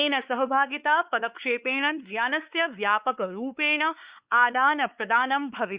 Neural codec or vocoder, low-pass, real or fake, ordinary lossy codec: codec, 16 kHz, 4 kbps, X-Codec, WavLM features, trained on Multilingual LibriSpeech; 3.6 kHz; fake; Opus, 64 kbps